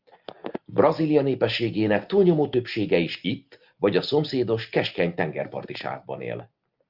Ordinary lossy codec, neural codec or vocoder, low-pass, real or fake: Opus, 24 kbps; none; 5.4 kHz; real